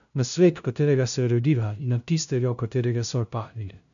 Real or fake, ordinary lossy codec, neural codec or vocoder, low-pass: fake; none; codec, 16 kHz, 0.5 kbps, FunCodec, trained on LibriTTS, 25 frames a second; 7.2 kHz